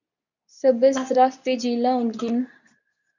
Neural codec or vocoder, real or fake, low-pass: codec, 24 kHz, 0.9 kbps, WavTokenizer, medium speech release version 2; fake; 7.2 kHz